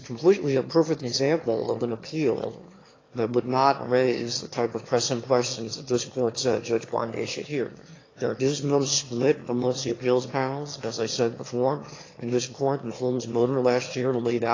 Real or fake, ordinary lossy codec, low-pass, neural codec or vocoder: fake; AAC, 32 kbps; 7.2 kHz; autoencoder, 22.05 kHz, a latent of 192 numbers a frame, VITS, trained on one speaker